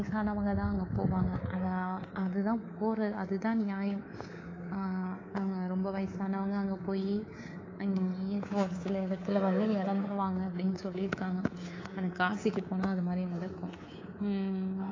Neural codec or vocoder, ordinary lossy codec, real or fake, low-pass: codec, 24 kHz, 3.1 kbps, DualCodec; AAC, 32 kbps; fake; 7.2 kHz